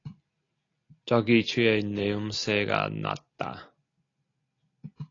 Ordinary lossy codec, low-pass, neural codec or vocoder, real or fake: AAC, 32 kbps; 7.2 kHz; none; real